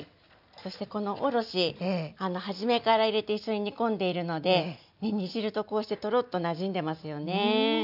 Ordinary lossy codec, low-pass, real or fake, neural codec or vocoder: none; 5.4 kHz; real; none